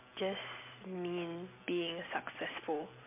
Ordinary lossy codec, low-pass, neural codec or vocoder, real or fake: MP3, 32 kbps; 3.6 kHz; vocoder, 44.1 kHz, 128 mel bands every 256 samples, BigVGAN v2; fake